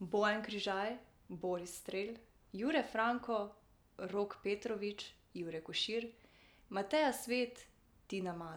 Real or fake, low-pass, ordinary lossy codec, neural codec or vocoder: real; none; none; none